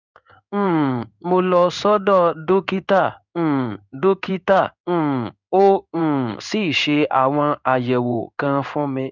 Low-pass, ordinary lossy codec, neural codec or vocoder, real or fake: 7.2 kHz; none; codec, 16 kHz in and 24 kHz out, 1 kbps, XY-Tokenizer; fake